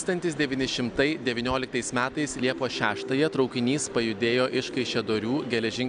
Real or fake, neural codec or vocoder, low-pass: real; none; 9.9 kHz